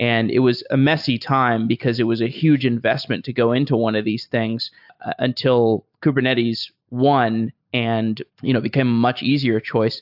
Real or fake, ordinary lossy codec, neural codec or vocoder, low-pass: real; AAC, 48 kbps; none; 5.4 kHz